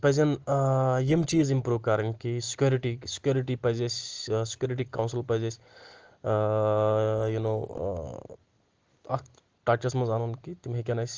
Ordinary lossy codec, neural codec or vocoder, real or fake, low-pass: Opus, 16 kbps; none; real; 7.2 kHz